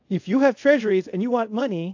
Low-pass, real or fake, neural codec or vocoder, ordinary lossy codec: 7.2 kHz; fake; codec, 24 kHz, 0.5 kbps, DualCodec; AAC, 48 kbps